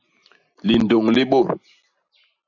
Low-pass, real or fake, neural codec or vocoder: 7.2 kHz; real; none